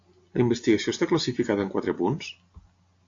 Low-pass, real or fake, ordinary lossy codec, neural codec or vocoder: 7.2 kHz; real; AAC, 48 kbps; none